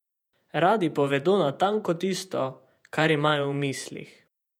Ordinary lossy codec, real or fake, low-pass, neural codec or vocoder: none; fake; 19.8 kHz; vocoder, 48 kHz, 128 mel bands, Vocos